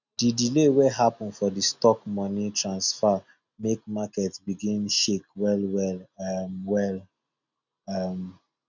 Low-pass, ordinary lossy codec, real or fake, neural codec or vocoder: 7.2 kHz; none; real; none